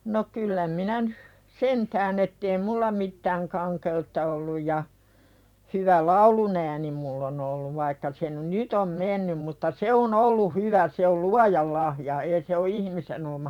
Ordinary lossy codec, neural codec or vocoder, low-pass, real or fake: none; vocoder, 44.1 kHz, 128 mel bands every 512 samples, BigVGAN v2; 19.8 kHz; fake